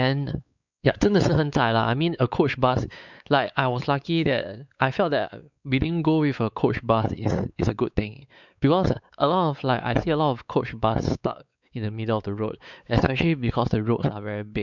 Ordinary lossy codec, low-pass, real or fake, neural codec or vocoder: none; 7.2 kHz; fake; codec, 16 kHz, 4 kbps, X-Codec, WavLM features, trained on Multilingual LibriSpeech